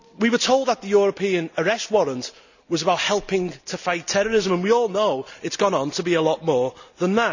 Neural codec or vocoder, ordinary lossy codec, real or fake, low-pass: none; none; real; 7.2 kHz